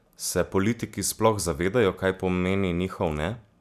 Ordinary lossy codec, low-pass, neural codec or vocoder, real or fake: none; 14.4 kHz; none; real